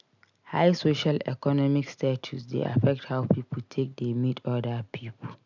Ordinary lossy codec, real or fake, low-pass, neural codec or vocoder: none; real; 7.2 kHz; none